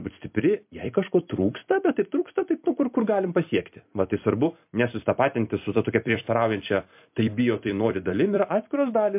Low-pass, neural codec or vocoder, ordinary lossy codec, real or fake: 3.6 kHz; vocoder, 44.1 kHz, 128 mel bands every 256 samples, BigVGAN v2; MP3, 32 kbps; fake